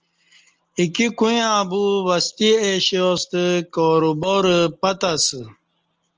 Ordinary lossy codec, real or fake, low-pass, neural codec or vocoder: Opus, 24 kbps; real; 7.2 kHz; none